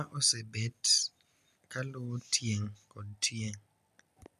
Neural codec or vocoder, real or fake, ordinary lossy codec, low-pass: none; real; none; 14.4 kHz